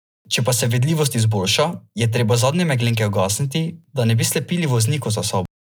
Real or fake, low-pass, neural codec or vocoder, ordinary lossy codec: real; none; none; none